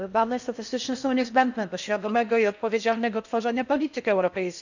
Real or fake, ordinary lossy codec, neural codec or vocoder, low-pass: fake; none; codec, 16 kHz in and 24 kHz out, 0.6 kbps, FocalCodec, streaming, 4096 codes; 7.2 kHz